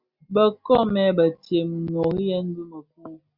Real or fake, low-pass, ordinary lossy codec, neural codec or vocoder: real; 5.4 kHz; Opus, 64 kbps; none